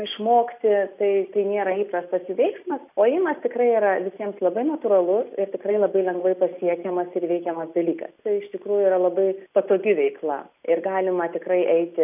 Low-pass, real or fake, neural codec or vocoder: 3.6 kHz; real; none